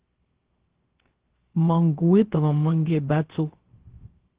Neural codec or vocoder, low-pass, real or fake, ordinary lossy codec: codec, 16 kHz, 0.3 kbps, FocalCodec; 3.6 kHz; fake; Opus, 16 kbps